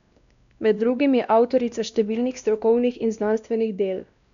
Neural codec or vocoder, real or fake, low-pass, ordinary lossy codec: codec, 16 kHz, 1 kbps, X-Codec, WavLM features, trained on Multilingual LibriSpeech; fake; 7.2 kHz; none